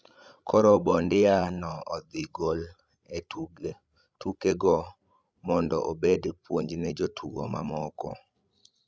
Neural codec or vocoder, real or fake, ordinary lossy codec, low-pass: codec, 16 kHz, 16 kbps, FreqCodec, larger model; fake; none; none